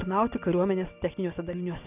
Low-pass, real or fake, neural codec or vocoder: 3.6 kHz; real; none